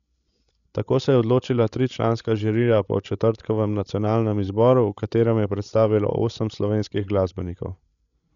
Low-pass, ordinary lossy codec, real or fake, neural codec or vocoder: 7.2 kHz; none; fake; codec, 16 kHz, 16 kbps, FreqCodec, larger model